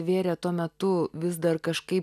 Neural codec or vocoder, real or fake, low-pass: none; real; 14.4 kHz